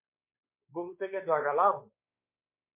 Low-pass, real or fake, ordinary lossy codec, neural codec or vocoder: 3.6 kHz; fake; MP3, 24 kbps; codec, 16 kHz, 4 kbps, X-Codec, WavLM features, trained on Multilingual LibriSpeech